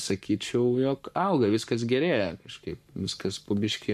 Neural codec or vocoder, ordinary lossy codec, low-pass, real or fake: codec, 44.1 kHz, 7.8 kbps, Pupu-Codec; MP3, 96 kbps; 14.4 kHz; fake